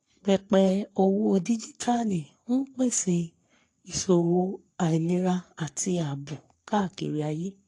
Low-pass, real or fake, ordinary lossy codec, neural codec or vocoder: 10.8 kHz; fake; AAC, 48 kbps; codec, 44.1 kHz, 3.4 kbps, Pupu-Codec